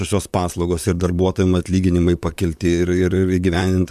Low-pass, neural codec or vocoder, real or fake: 14.4 kHz; vocoder, 44.1 kHz, 128 mel bands, Pupu-Vocoder; fake